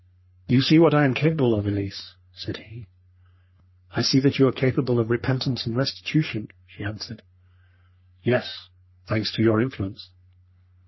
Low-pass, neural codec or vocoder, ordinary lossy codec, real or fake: 7.2 kHz; codec, 44.1 kHz, 3.4 kbps, Pupu-Codec; MP3, 24 kbps; fake